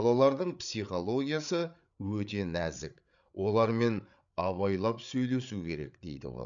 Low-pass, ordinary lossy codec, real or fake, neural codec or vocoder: 7.2 kHz; none; fake; codec, 16 kHz, 8 kbps, FreqCodec, larger model